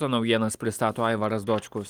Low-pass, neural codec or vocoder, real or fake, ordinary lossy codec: 19.8 kHz; none; real; Opus, 32 kbps